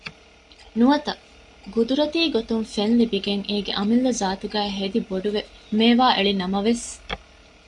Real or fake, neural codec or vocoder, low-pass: real; none; 10.8 kHz